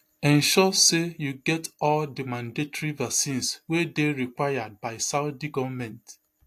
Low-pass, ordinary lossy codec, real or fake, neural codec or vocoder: 14.4 kHz; AAC, 64 kbps; real; none